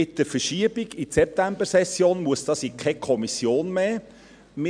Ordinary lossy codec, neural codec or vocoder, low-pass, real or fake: none; none; 9.9 kHz; real